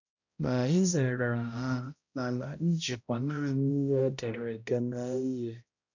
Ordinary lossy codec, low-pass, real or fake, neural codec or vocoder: none; 7.2 kHz; fake; codec, 16 kHz, 0.5 kbps, X-Codec, HuBERT features, trained on balanced general audio